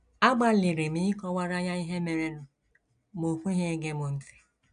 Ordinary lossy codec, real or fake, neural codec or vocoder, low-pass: none; real; none; 9.9 kHz